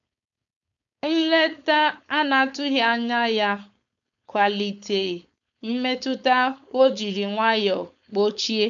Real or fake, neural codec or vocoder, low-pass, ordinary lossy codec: fake; codec, 16 kHz, 4.8 kbps, FACodec; 7.2 kHz; none